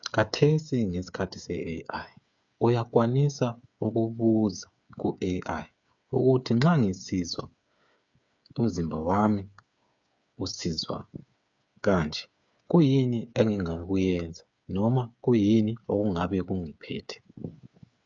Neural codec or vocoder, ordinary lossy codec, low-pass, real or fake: codec, 16 kHz, 8 kbps, FreqCodec, smaller model; AAC, 64 kbps; 7.2 kHz; fake